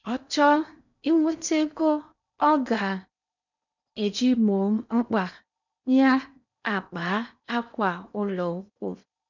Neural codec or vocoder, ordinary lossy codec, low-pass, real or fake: codec, 16 kHz in and 24 kHz out, 0.8 kbps, FocalCodec, streaming, 65536 codes; none; 7.2 kHz; fake